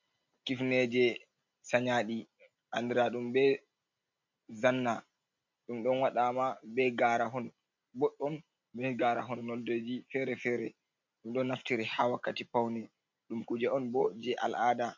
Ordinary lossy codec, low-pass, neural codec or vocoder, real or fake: MP3, 64 kbps; 7.2 kHz; none; real